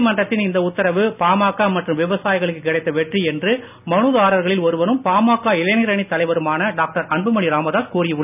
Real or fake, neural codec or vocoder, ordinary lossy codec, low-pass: real; none; none; 3.6 kHz